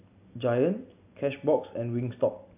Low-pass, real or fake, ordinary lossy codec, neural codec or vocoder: 3.6 kHz; real; AAC, 32 kbps; none